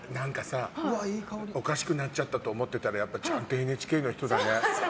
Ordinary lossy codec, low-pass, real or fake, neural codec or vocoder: none; none; real; none